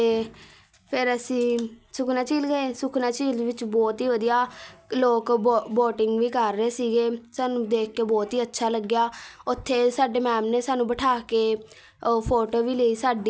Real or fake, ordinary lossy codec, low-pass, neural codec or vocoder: real; none; none; none